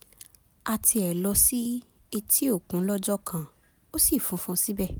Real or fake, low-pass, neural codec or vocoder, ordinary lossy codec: real; none; none; none